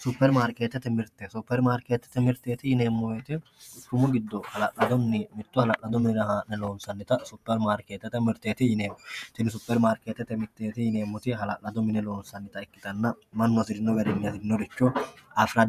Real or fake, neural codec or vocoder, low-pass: fake; vocoder, 48 kHz, 128 mel bands, Vocos; 14.4 kHz